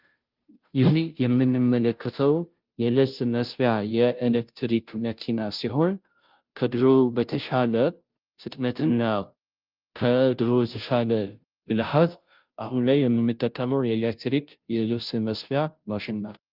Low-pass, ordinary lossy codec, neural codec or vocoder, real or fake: 5.4 kHz; Opus, 24 kbps; codec, 16 kHz, 0.5 kbps, FunCodec, trained on Chinese and English, 25 frames a second; fake